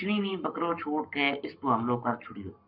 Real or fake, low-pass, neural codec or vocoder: fake; 5.4 kHz; codec, 44.1 kHz, 7.8 kbps, Pupu-Codec